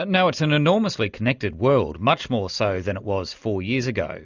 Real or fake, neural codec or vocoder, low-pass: real; none; 7.2 kHz